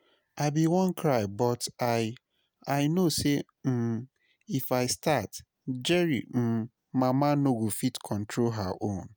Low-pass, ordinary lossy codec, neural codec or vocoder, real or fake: none; none; none; real